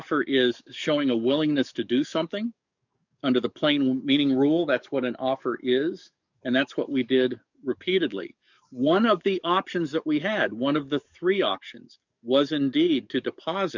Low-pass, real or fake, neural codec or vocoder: 7.2 kHz; fake; codec, 44.1 kHz, 7.8 kbps, DAC